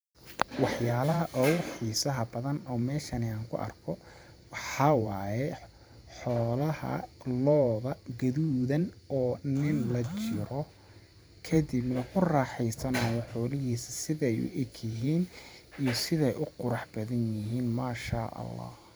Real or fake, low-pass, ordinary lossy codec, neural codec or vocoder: fake; none; none; vocoder, 44.1 kHz, 128 mel bands every 512 samples, BigVGAN v2